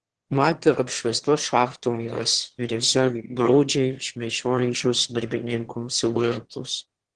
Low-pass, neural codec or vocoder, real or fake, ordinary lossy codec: 9.9 kHz; autoencoder, 22.05 kHz, a latent of 192 numbers a frame, VITS, trained on one speaker; fake; Opus, 16 kbps